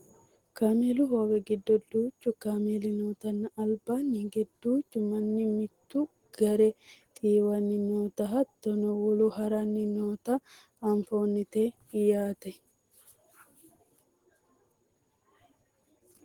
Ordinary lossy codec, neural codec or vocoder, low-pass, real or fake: Opus, 16 kbps; none; 19.8 kHz; real